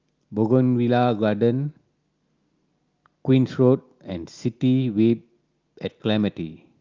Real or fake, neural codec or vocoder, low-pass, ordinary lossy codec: real; none; 7.2 kHz; Opus, 24 kbps